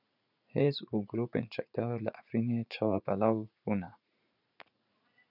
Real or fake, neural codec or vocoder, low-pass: fake; vocoder, 44.1 kHz, 80 mel bands, Vocos; 5.4 kHz